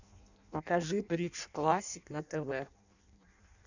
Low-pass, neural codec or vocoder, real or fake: 7.2 kHz; codec, 16 kHz in and 24 kHz out, 0.6 kbps, FireRedTTS-2 codec; fake